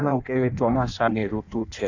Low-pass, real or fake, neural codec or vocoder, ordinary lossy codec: 7.2 kHz; fake; codec, 16 kHz in and 24 kHz out, 0.6 kbps, FireRedTTS-2 codec; none